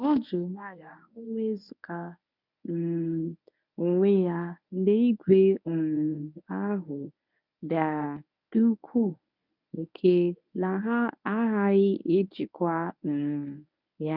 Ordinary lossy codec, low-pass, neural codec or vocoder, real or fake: none; 5.4 kHz; codec, 24 kHz, 0.9 kbps, WavTokenizer, medium speech release version 1; fake